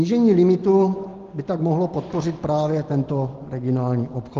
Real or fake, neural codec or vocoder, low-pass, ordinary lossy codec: real; none; 7.2 kHz; Opus, 16 kbps